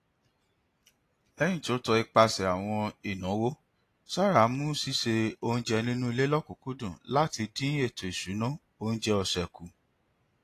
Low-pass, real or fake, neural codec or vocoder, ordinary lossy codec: 14.4 kHz; real; none; AAC, 48 kbps